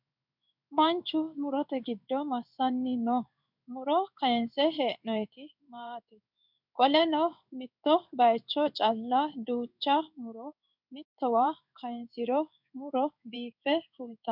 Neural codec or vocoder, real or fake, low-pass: codec, 16 kHz in and 24 kHz out, 1 kbps, XY-Tokenizer; fake; 5.4 kHz